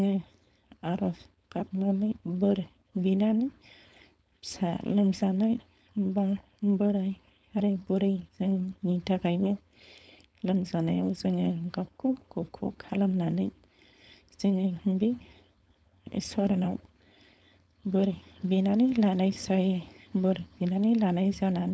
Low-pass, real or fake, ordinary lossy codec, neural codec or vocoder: none; fake; none; codec, 16 kHz, 4.8 kbps, FACodec